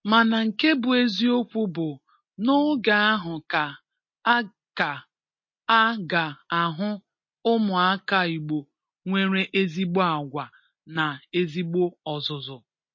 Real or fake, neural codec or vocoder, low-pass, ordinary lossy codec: real; none; 7.2 kHz; MP3, 32 kbps